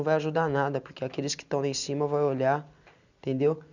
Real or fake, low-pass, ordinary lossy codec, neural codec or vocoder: real; 7.2 kHz; none; none